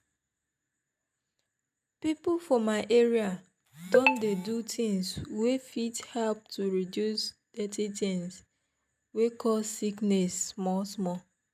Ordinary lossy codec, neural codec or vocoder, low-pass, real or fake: none; none; 14.4 kHz; real